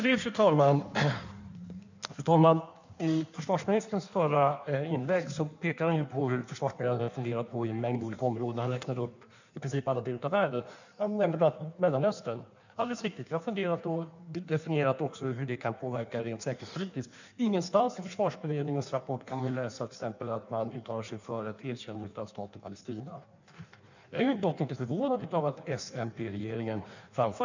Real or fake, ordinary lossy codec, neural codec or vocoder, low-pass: fake; none; codec, 16 kHz in and 24 kHz out, 1.1 kbps, FireRedTTS-2 codec; 7.2 kHz